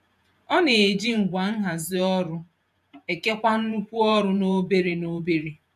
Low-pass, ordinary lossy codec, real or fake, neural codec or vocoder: 14.4 kHz; none; fake; vocoder, 48 kHz, 128 mel bands, Vocos